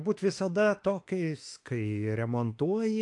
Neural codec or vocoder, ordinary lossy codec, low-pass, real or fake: autoencoder, 48 kHz, 32 numbers a frame, DAC-VAE, trained on Japanese speech; AAC, 48 kbps; 10.8 kHz; fake